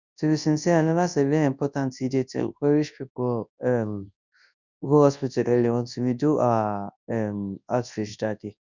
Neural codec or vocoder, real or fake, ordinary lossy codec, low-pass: codec, 24 kHz, 0.9 kbps, WavTokenizer, large speech release; fake; none; 7.2 kHz